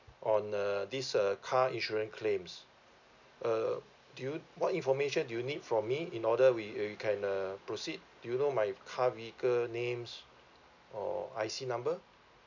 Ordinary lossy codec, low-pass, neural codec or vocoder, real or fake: none; 7.2 kHz; none; real